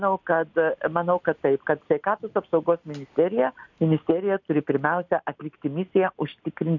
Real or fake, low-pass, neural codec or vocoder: real; 7.2 kHz; none